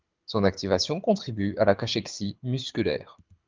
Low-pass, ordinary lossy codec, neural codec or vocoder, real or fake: 7.2 kHz; Opus, 24 kbps; codec, 24 kHz, 6 kbps, HILCodec; fake